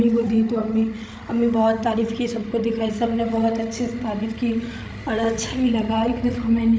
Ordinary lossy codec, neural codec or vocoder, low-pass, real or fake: none; codec, 16 kHz, 16 kbps, FreqCodec, larger model; none; fake